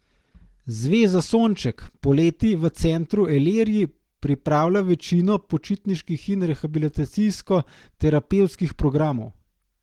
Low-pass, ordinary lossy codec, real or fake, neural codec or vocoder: 19.8 kHz; Opus, 16 kbps; real; none